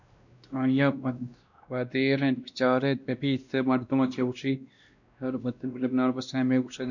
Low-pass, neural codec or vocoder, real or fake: 7.2 kHz; codec, 16 kHz, 1 kbps, X-Codec, WavLM features, trained on Multilingual LibriSpeech; fake